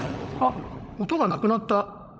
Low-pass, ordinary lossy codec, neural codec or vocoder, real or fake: none; none; codec, 16 kHz, 16 kbps, FunCodec, trained on LibriTTS, 50 frames a second; fake